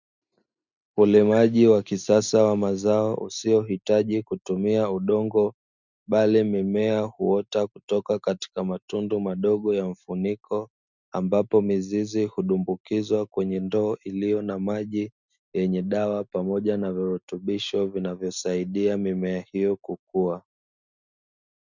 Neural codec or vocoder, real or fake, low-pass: none; real; 7.2 kHz